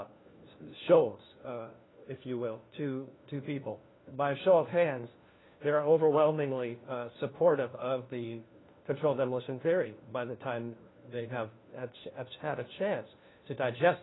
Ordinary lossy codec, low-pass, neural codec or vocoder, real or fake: AAC, 16 kbps; 7.2 kHz; codec, 16 kHz, 1 kbps, FunCodec, trained on LibriTTS, 50 frames a second; fake